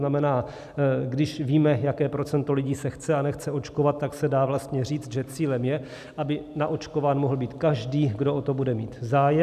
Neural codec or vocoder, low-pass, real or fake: none; 14.4 kHz; real